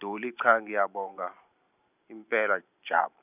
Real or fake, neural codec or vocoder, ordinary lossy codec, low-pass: fake; vocoder, 44.1 kHz, 128 mel bands every 512 samples, BigVGAN v2; none; 3.6 kHz